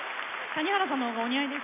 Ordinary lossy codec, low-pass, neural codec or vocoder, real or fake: none; 3.6 kHz; none; real